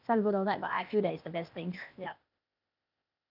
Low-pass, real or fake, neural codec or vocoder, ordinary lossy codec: 5.4 kHz; fake; codec, 16 kHz, 0.8 kbps, ZipCodec; none